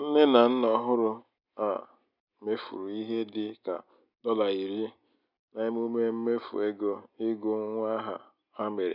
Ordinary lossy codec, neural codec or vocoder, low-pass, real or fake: none; none; 5.4 kHz; real